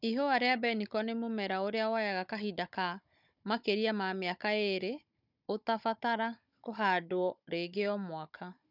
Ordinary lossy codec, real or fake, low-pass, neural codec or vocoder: none; fake; 5.4 kHz; codec, 16 kHz, 16 kbps, FunCodec, trained on Chinese and English, 50 frames a second